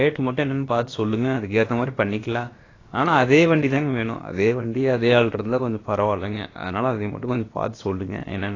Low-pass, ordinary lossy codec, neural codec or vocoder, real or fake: 7.2 kHz; AAC, 32 kbps; codec, 16 kHz, about 1 kbps, DyCAST, with the encoder's durations; fake